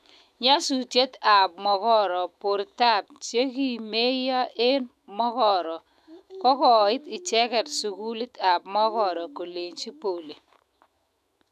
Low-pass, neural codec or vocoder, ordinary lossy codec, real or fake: 14.4 kHz; autoencoder, 48 kHz, 128 numbers a frame, DAC-VAE, trained on Japanese speech; none; fake